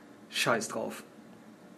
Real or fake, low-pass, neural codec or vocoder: real; 14.4 kHz; none